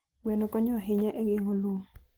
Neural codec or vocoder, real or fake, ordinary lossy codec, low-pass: none; real; Opus, 16 kbps; 19.8 kHz